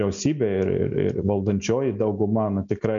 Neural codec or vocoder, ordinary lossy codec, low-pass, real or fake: none; MP3, 64 kbps; 7.2 kHz; real